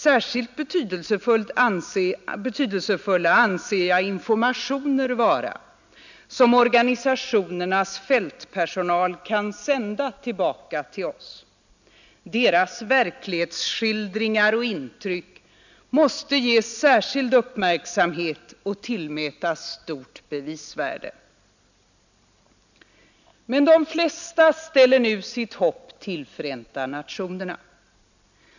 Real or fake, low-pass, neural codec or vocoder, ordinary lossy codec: real; 7.2 kHz; none; none